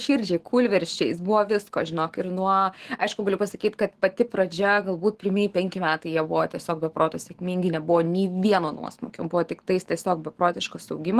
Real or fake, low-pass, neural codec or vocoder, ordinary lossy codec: fake; 14.4 kHz; autoencoder, 48 kHz, 128 numbers a frame, DAC-VAE, trained on Japanese speech; Opus, 16 kbps